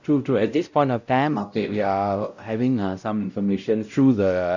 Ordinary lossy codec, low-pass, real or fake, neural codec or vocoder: none; 7.2 kHz; fake; codec, 16 kHz, 0.5 kbps, X-Codec, WavLM features, trained on Multilingual LibriSpeech